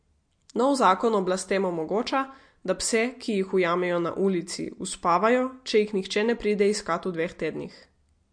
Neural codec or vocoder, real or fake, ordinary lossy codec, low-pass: none; real; MP3, 48 kbps; 9.9 kHz